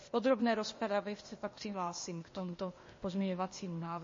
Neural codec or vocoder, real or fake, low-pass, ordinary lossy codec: codec, 16 kHz, 0.8 kbps, ZipCodec; fake; 7.2 kHz; MP3, 32 kbps